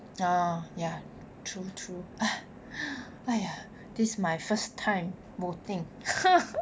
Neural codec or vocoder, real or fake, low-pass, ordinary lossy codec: none; real; none; none